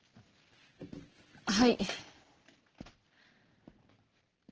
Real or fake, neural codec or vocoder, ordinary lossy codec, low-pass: real; none; Opus, 16 kbps; 7.2 kHz